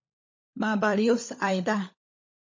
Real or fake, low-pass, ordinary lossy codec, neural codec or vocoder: fake; 7.2 kHz; MP3, 32 kbps; codec, 16 kHz, 16 kbps, FunCodec, trained on LibriTTS, 50 frames a second